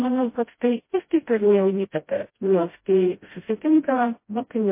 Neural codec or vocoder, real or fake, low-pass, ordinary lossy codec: codec, 16 kHz, 0.5 kbps, FreqCodec, smaller model; fake; 3.6 kHz; MP3, 24 kbps